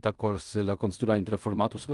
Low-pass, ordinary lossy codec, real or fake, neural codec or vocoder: 10.8 kHz; Opus, 32 kbps; fake; codec, 16 kHz in and 24 kHz out, 0.4 kbps, LongCat-Audio-Codec, fine tuned four codebook decoder